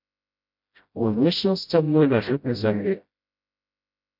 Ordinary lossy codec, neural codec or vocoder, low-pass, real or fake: MP3, 48 kbps; codec, 16 kHz, 0.5 kbps, FreqCodec, smaller model; 5.4 kHz; fake